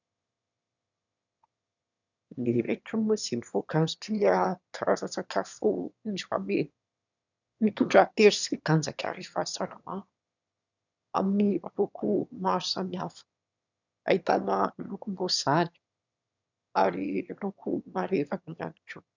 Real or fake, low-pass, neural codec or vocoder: fake; 7.2 kHz; autoencoder, 22.05 kHz, a latent of 192 numbers a frame, VITS, trained on one speaker